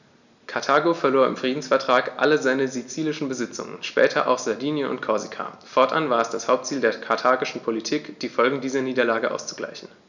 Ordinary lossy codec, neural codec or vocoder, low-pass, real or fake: none; none; 7.2 kHz; real